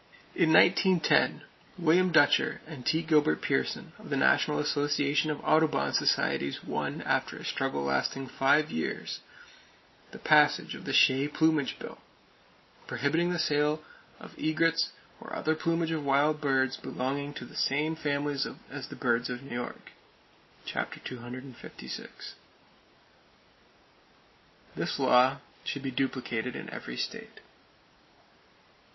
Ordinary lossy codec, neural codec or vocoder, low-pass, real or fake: MP3, 24 kbps; none; 7.2 kHz; real